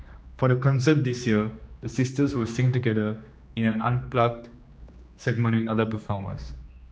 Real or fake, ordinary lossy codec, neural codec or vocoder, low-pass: fake; none; codec, 16 kHz, 2 kbps, X-Codec, HuBERT features, trained on general audio; none